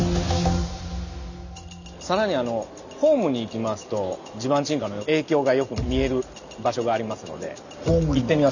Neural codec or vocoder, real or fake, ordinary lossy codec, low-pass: none; real; none; 7.2 kHz